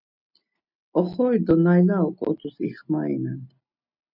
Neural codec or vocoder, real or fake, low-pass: none; real; 5.4 kHz